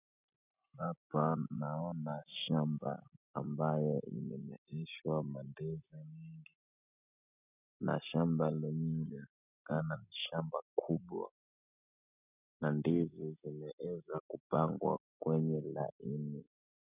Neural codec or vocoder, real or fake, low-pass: none; real; 3.6 kHz